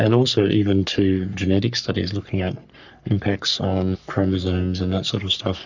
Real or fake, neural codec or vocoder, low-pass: fake; codec, 44.1 kHz, 3.4 kbps, Pupu-Codec; 7.2 kHz